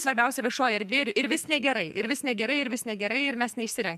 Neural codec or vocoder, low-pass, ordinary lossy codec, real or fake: codec, 44.1 kHz, 2.6 kbps, SNAC; 14.4 kHz; MP3, 96 kbps; fake